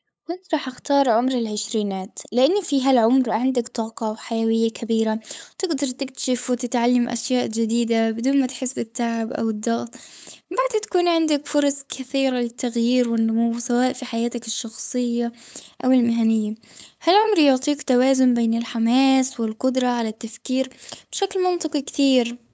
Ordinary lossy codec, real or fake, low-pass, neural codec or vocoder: none; fake; none; codec, 16 kHz, 8 kbps, FunCodec, trained on LibriTTS, 25 frames a second